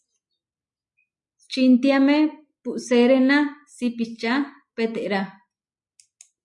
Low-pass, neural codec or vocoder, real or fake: 10.8 kHz; none; real